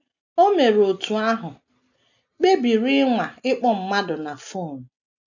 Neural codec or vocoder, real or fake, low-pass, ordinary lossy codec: none; real; 7.2 kHz; none